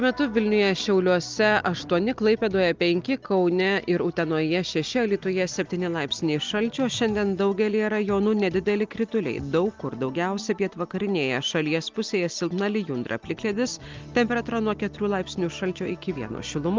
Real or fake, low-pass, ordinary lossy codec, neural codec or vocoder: real; 7.2 kHz; Opus, 32 kbps; none